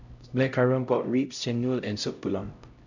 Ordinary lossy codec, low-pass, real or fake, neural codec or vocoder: none; 7.2 kHz; fake; codec, 16 kHz, 0.5 kbps, X-Codec, HuBERT features, trained on LibriSpeech